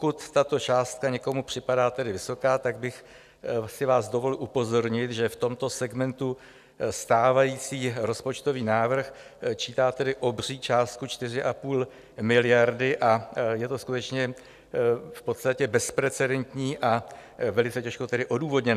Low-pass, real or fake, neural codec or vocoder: 14.4 kHz; real; none